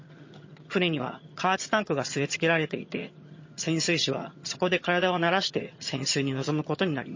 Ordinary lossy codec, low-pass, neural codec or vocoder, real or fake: MP3, 32 kbps; 7.2 kHz; vocoder, 22.05 kHz, 80 mel bands, HiFi-GAN; fake